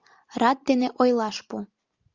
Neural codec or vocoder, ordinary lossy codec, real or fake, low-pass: none; Opus, 32 kbps; real; 7.2 kHz